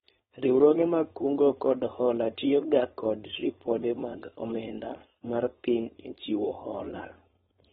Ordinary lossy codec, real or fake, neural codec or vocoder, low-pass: AAC, 16 kbps; fake; codec, 16 kHz, 4.8 kbps, FACodec; 7.2 kHz